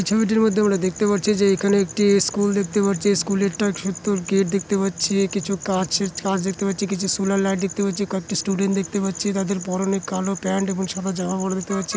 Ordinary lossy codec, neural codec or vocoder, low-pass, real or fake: none; none; none; real